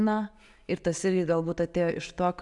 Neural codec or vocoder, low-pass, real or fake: none; 10.8 kHz; real